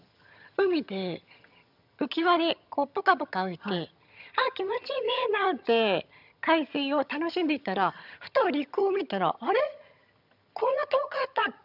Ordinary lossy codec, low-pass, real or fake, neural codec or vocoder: none; 5.4 kHz; fake; vocoder, 22.05 kHz, 80 mel bands, HiFi-GAN